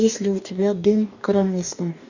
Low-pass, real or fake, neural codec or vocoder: 7.2 kHz; fake; codec, 44.1 kHz, 2.6 kbps, DAC